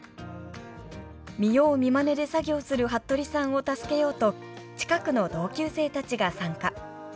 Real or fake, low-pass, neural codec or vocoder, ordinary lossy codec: real; none; none; none